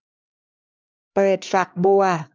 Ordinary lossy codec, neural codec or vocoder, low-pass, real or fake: none; codec, 16 kHz, 2 kbps, X-Codec, HuBERT features, trained on LibriSpeech; none; fake